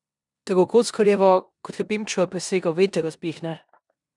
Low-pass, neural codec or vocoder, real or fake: 10.8 kHz; codec, 16 kHz in and 24 kHz out, 0.9 kbps, LongCat-Audio-Codec, four codebook decoder; fake